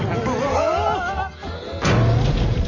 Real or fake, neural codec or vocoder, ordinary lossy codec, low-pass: real; none; none; 7.2 kHz